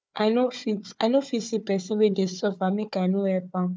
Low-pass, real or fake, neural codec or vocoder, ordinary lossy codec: none; fake; codec, 16 kHz, 4 kbps, FunCodec, trained on Chinese and English, 50 frames a second; none